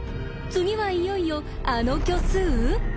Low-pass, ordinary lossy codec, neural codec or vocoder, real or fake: none; none; none; real